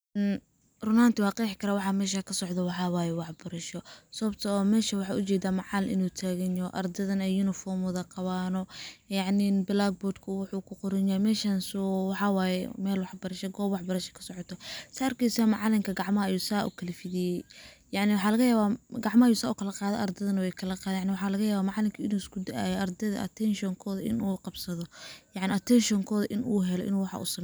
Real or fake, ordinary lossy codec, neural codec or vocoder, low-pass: real; none; none; none